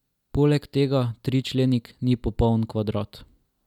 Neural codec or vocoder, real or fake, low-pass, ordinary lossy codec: none; real; 19.8 kHz; none